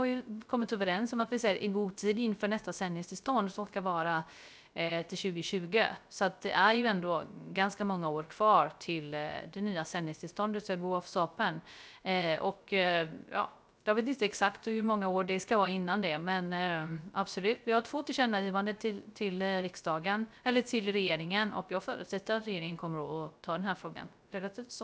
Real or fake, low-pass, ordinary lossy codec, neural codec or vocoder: fake; none; none; codec, 16 kHz, 0.3 kbps, FocalCodec